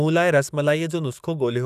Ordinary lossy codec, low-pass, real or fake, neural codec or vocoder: none; 14.4 kHz; fake; codec, 44.1 kHz, 7.8 kbps, DAC